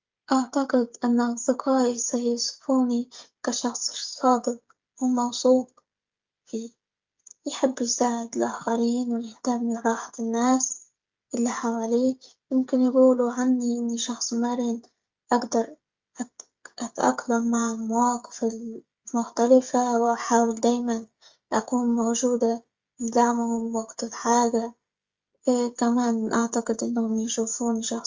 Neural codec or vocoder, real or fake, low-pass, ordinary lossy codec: codec, 16 kHz, 16 kbps, FreqCodec, smaller model; fake; 7.2 kHz; Opus, 32 kbps